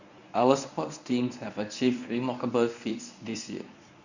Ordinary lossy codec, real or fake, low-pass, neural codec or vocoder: none; fake; 7.2 kHz; codec, 24 kHz, 0.9 kbps, WavTokenizer, medium speech release version 1